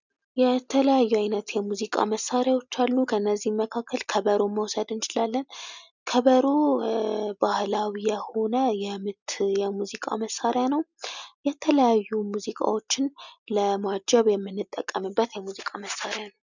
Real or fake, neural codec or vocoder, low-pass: real; none; 7.2 kHz